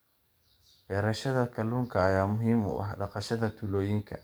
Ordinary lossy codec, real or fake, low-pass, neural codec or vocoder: none; fake; none; codec, 44.1 kHz, 7.8 kbps, DAC